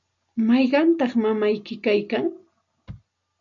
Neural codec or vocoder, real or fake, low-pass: none; real; 7.2 kHz